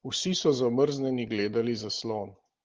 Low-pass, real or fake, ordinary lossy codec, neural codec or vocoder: 7.2 kHz; real; Opus, 16 kbps; none